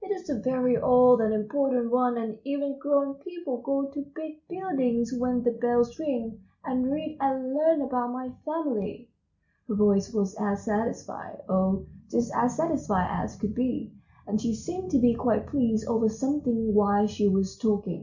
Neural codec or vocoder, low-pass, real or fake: none; 7.2 kHz; real